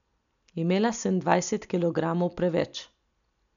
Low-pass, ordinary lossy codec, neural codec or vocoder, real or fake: 7.2 kHz; none; none; real